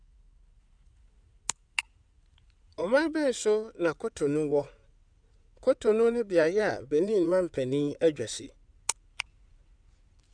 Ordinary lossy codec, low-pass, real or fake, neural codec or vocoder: none; 9.9 kHz; fake; codec, 16 kHz in and 24 kHz out, 2.2 kbps, FireRedTTS-2 codec